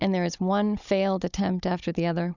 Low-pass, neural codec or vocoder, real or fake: 7.2 kHz; codec, 16 kHz, 16 kbps, FunCodec, trained on Chinese and English, 50 frames a second; fake